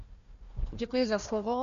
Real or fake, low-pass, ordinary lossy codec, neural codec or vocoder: fake; 7.2 kHz; MP3, 96 kbps; codec, 16 kHz, 1 kbps, FunCodec, trained on Chinese and English, 50 frames a second